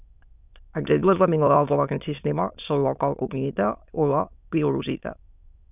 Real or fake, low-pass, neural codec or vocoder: fake; 3.6 kHz; autoencoder, 22.05 kHz, a latent of 192 numbers a frame, VITS, trained on many speakers